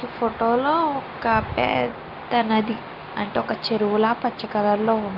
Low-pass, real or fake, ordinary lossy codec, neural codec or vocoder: 5.4 kHz; real; Opus, 24 kbps; none